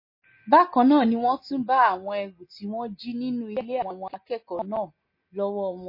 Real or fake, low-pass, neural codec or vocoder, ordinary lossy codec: real; 5.4 kHz; none; MP3, 24 kbps